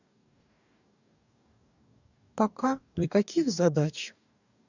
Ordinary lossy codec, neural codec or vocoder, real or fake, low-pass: none; codec, 44.1 kHz, 2.6 kbps, DAC; fake; 7.2 kHz